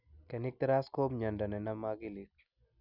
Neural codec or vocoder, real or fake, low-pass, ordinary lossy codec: none; real; 5.4 kHz; none